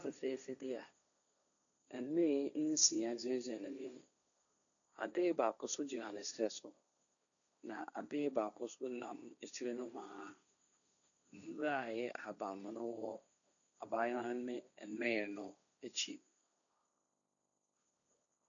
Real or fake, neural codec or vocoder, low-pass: fake; codec, 16 kHz, 1.1 kbps, Voila-Tokenizer; 7.2 kHz